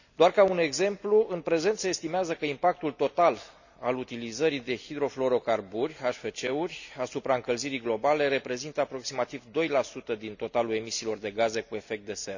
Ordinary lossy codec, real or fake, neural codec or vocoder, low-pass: none; real; none; 7.2 kHz